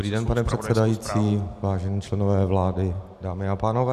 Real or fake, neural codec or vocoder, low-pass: real; none; 14.4 kHz